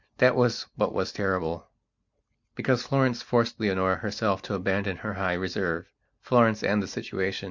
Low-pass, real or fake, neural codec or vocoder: 7.2 kHz; real; none